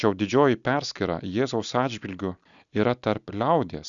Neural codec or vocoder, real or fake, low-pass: none; real; 7.2 kHz